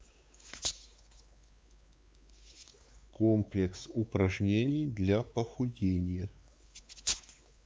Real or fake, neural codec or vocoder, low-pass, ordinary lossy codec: fake; codec, 16 kHz, 4 kbps, X-Codec, WavLM features, trained on Multilingual LibriSpeech; none; none